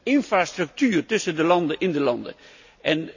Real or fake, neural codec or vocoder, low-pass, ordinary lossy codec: real; none; 7.2 kHz; none